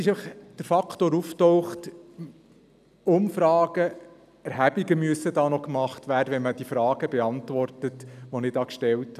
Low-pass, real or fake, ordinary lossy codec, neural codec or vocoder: 14.4 kHz; real; none; none